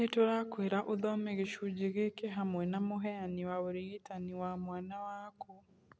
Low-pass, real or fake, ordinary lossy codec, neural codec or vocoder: none; real; none; none